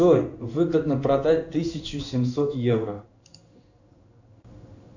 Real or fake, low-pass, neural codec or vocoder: fake; 7.2 kHz; codec, 16 kHz in and 24 kHz out, 1 kbps, XY-Tokenizer